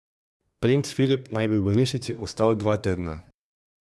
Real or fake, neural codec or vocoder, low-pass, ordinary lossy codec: fake; codec, 24 kHz, 1 kbps, SNAC; none; none